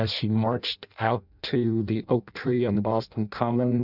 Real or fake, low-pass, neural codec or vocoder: fake; 5.4 kHz; codec, 16 kHz in and 24 kHz out, 0.6 kbps, FireRedTTS-2 codec